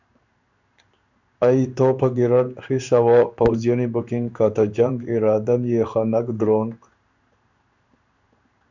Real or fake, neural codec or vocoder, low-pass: fake; codec, 16 kHz in and 24 kHz out, 1 kbps, XY-Tokenizer; 7.2 kHz